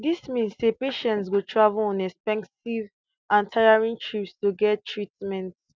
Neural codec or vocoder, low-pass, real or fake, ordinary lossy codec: none; none; real; none